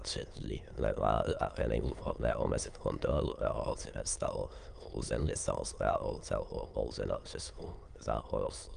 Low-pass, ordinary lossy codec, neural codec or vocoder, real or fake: 9.9 kHz; Opus, 32 kbps; autoencoder, 22.05 kHz, a latent of 192 numbers a frame, VITS, trained on many speakers; fake